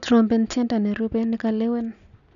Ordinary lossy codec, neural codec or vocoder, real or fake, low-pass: none; none; real; 7.2 kHz